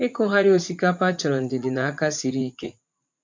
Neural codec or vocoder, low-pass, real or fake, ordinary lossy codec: vocoder, 44.1 kHz, 80 mel bands, Vocos; 7.2 kHz; fake; MP3, 64 kbps